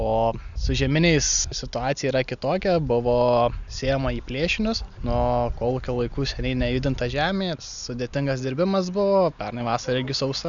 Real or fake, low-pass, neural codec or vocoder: real; 7.2 kHz; none